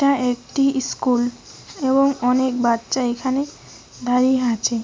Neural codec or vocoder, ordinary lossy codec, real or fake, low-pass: none; none; real; none